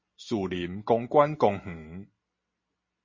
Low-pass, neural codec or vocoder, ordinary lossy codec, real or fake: 7.2 kHz; none; MP3, 32 kbps; real